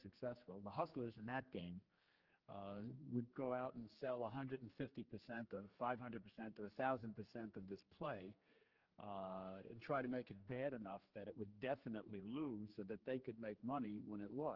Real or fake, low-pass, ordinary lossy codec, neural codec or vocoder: fake; 5.4 kHz; Opus, 16 kbps; codec, 16 kHz, 2 kbps, X-Codec, HuBERT features, trained on general audio